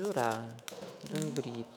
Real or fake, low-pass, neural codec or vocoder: fake; 19.8 kHz; autoencoder, 48 kHz, 128 numbers a frame, DAC-VAE, trained on Japanese speech